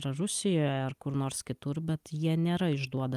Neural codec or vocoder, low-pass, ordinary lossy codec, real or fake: none; 14.4 kHz; Opus, 32 kbps; real